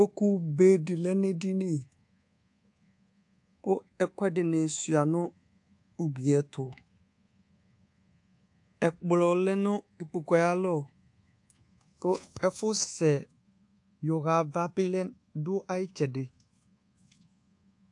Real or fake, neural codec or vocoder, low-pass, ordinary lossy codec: fake; codec, 24 kHz, 1.2 kbps, DualCodec; 10.8 kHz; AAC, 64 kbps